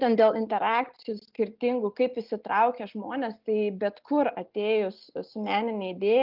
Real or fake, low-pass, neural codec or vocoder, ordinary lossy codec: real; 5.4 kHz; none; Opus, 32 kbps